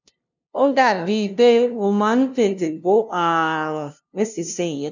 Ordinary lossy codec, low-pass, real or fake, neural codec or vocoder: none; 7.2 kHz; fake; codec, 16 kHz, 0.5 kbps, FunCodec, trained on LibriTTS, 25 frames a second